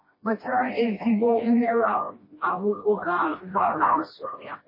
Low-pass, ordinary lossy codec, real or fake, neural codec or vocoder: 5.4 kHz; MP3, 24 kbps; fake; codec, 16 kHz, 1 kbps, FreqCodec, smaller model